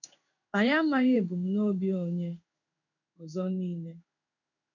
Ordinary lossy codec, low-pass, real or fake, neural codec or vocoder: none; 7.2 kHz; fake; codec, 16 kHz in and 24 kHz out, 1 kbps, XY-Tokenizer